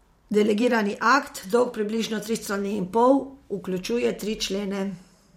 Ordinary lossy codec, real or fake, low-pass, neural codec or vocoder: MP3, 64 kbps; fake; 19.8 kHz; vocoder, 44.1 kHz, 128 mel bands every 512 samples, BigVGAN v2